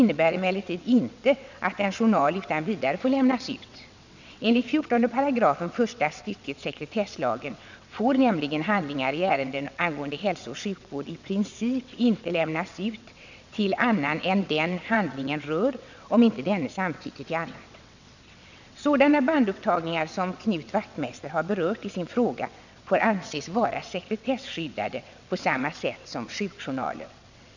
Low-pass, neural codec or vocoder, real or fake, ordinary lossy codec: 7.2 kHz; vocoder, 22.05 kHz, 80 mel bands, WaveNeXt; fake; none